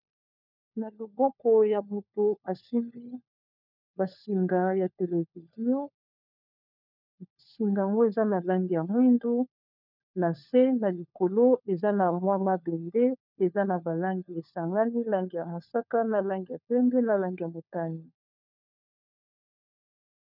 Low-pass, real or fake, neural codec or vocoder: 5.4 kHz; fake; codec, 16 kHz, 4 kbps, FunCodec, trained on LibriTTS, 50 frames a second